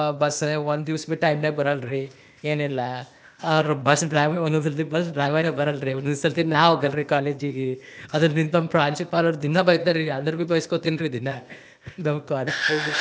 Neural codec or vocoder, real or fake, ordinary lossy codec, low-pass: codec, 16 kHz, 0.8 kbps, ZipCodec; fake; none; none